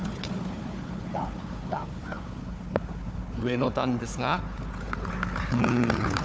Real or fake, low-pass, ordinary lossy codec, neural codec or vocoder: fake; none; none; codec, 16 kHz, 4 kbps, FunCodec, trained on Chinese and English, 50 frames a second